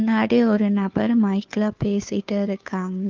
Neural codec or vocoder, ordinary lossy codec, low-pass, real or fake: codec, 16 kHz, 2 kbps, FunCodec, trained on Chinese and English, 25 frames a second; Opus, 24 kbps; 7.2 kHz; fake